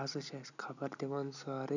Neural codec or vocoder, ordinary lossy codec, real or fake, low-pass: none; none; real; 7.2 kHz